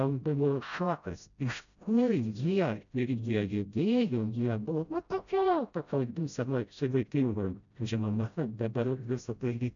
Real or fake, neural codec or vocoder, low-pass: fake; codec, 16 kHz, 0.5 kbps, FreqCodec, smaller model; 7.2 kHz